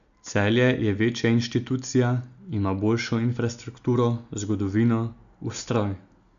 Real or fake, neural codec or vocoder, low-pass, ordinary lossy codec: real; none; 7.2 kHz; MP3, 96 kbps